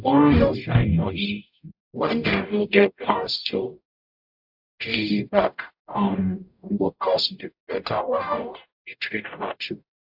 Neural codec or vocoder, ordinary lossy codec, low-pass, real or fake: codec, 44.1 kHz, 0.9 kbps, DAC; AAC, 48 kbps; 5.4 kHz; fake